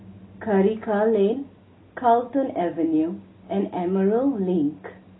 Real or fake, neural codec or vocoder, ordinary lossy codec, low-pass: real; none; AAC, 16 kbps; 7.2 kHz